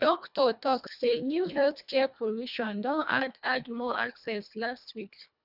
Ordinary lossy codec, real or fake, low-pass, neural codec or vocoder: none; fake; 5.4 kHz; codec, 24 kHz, 1.5 kbps, HILCodec